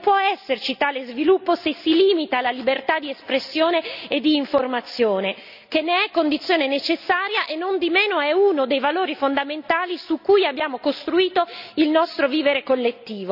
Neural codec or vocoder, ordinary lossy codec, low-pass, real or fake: none; none; 5.4 kHz; real